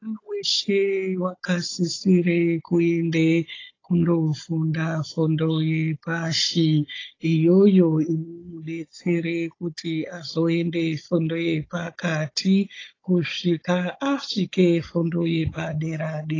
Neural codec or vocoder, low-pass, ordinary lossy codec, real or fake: codec, 16 kHz, 16 kbps, FunCodec, trained on Chinese and English, 50 frames a second; 7.2 kHz; AAC, 32 kbps; fake